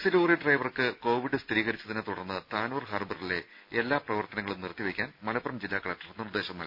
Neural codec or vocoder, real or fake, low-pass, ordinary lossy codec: none; real; 5.4 kHz; none